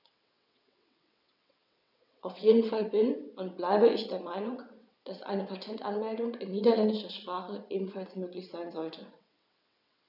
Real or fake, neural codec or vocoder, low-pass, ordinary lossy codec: fake; vocoder, 22.05 kHz, 80 mel bands, WaveNeXt; 5.4 kHz; none